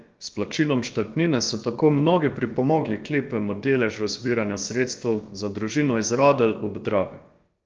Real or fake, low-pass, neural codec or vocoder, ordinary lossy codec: fake; 7.2 kHz; codec, 16 kHz, about 1 kbps, DyCAST, with the encoder's durations; Opus, 24 kbps